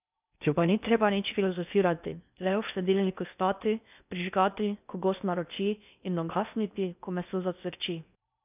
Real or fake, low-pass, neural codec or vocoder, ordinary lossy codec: fake; 3.6 kHz; codec, 16 kHz in and 24 kHz out, 0.6 kbps, FocalCodec, streaming, 2048 codes; none